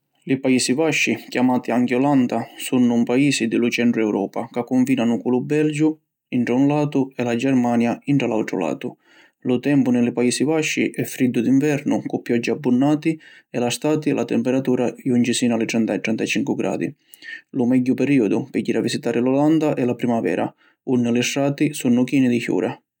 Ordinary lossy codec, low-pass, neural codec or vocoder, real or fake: none; 19.8 kHz; none; real